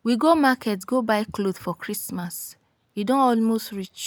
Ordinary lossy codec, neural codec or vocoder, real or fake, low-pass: none; none; real; none